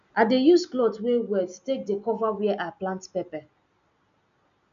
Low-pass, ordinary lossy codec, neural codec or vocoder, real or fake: 7.2 kHz; none; none; real